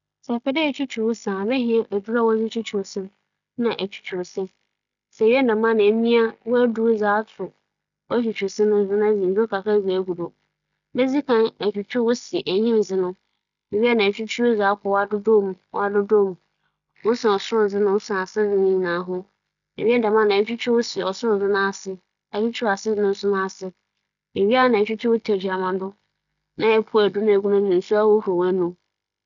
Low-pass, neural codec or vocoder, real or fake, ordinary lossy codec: 7.2 kHz; none; real; none